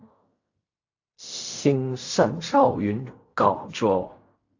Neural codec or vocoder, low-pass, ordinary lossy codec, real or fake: codec, 16 kHz in and 24 kHz out, 0.4 kbps, LongCat-Audio-Codec, fine tuned four codebook decoder; 7.2 kHz; AAC, 48 kbps; fake